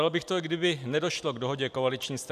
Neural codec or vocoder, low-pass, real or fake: none; 14.4 kHz; real